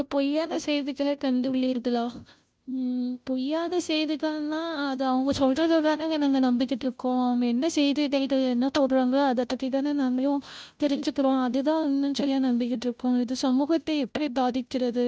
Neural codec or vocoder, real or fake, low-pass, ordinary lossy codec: codec, 16 kHz, 0.5 kbps, FunCodec, trained on Chinese and English, 25 frames a second; fake; none; none